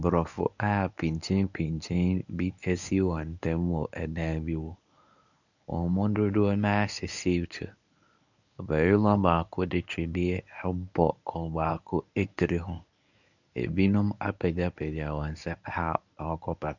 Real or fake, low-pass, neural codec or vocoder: fake; 7.2 kHz; codec, 24 kHz, 0.9 kbps, WavTokenizer, medium speech release version 1